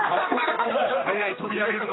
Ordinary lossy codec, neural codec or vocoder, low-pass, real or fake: AAC, 16 kbps; codec, 16 kHz, 2 kbps, X-Codec, HuBERT features, trained on general audio; 7.2 kHz; fake